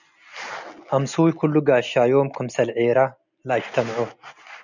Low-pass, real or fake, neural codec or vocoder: 7.2 kHz; real; none